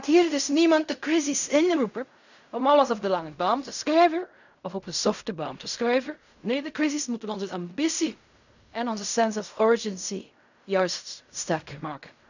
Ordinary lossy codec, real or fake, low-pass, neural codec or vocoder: none; fake; 7.2 kHz; codec, 16 kHz in and 24 kHz out, 0.4 kbps, LongCat-Audio-Codec, fine tuned four codebook decoder